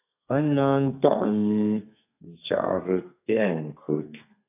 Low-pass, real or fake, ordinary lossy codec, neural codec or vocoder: 3.6 kHz; fake; AAC, 32 kbps; codec, 32 kHz, 1.9 kbps, SNAC